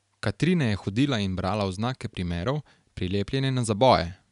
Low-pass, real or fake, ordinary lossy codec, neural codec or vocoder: 10.8 kHz; real; none; none